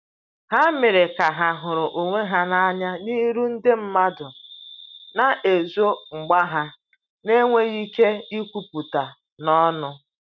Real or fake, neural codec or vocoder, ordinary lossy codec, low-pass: real; none; none; 7.2 kHz